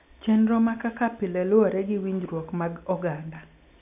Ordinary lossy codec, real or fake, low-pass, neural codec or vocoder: AAC, 32 kbps; real; 3.6 kHz; none